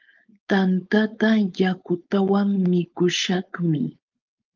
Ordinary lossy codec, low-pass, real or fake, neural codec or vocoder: Opus, 32 kbps; 7.2 kHz; fake; codec, 16 kHz, 4.8 kbps, FACodec